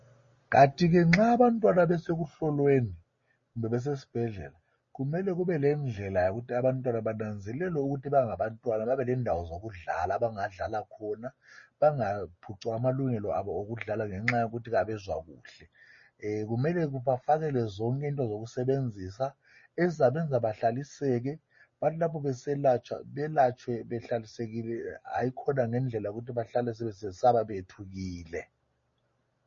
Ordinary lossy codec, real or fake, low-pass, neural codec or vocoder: MP3, 32 kbps; real; 7.2 kHz; none